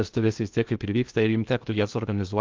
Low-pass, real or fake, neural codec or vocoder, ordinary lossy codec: 7.2 kHz; fake; codec, 16 kHz in and 24 kHz out, 0.6 kbps, FocalCodec, streaming, 2048 codes; Opus, 24 kbps